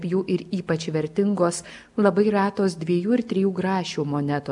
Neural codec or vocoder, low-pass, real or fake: none; 10.8 kHz; real